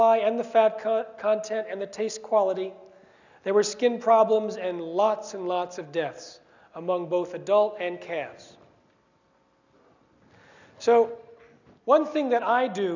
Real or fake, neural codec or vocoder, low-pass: real; none; 7.2 kHz